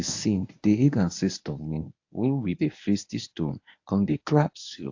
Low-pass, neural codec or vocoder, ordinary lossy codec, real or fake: 7.2 kHz; codec, 24 kHz, 0.9 kbps, WavTokenizer, medium speech release version 1; AAC, 48 kbps; fake